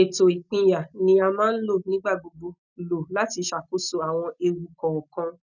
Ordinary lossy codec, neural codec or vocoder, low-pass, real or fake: none; none; 7.2 kHz; real